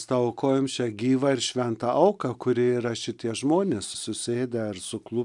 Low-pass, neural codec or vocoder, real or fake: 10.8 kHz; none; real